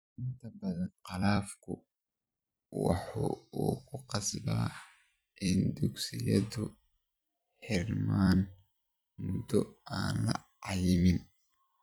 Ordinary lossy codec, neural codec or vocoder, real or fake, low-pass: none; vocoder, 44.1 kHz, 128 mel bands every 256 samples, BigVGAN v2; fake; none